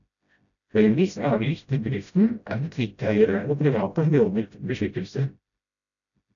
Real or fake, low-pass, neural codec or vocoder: fake; 7.2 kHz; codec, 16 kHz, 0.5 kbps, FreqCodec, smaller model